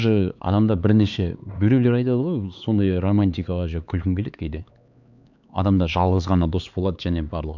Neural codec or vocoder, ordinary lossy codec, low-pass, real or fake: codec, 16 kHz, 4 kbps, X-Codec, HuBERT features, trained on LibriSpeech; none; 7.2 kHz; fake